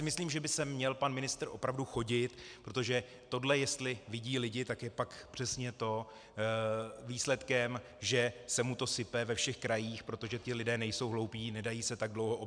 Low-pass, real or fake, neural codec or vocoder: 9.9 kHz; real; none